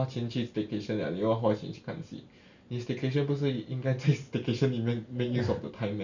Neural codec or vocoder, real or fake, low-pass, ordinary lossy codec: none; real; 7.2 kHz; none